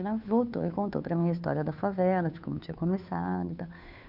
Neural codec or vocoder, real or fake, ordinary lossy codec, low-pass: codec, 16 kHz, 2 kbps, FunCodec, trained on Chinese and English, 25 frames a second; fake; none; 5.4 kHz